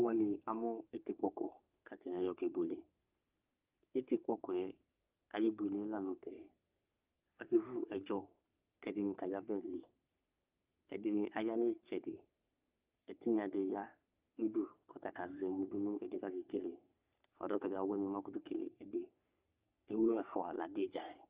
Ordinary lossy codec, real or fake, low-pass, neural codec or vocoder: Opus, 32 kbps; fake; 3.6 kHz; codec, 44.1 kHz, 3.4 kbps, Pupu-Codec